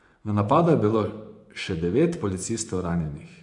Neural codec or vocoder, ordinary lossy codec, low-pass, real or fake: vocoder, 24 kHz, 100 mel bands, Vocos; AAC, 64 kbps; 10.8 kHz; fake